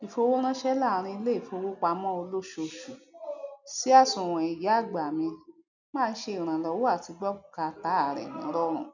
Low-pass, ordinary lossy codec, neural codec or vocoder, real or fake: 7.2 kHz; none; none; real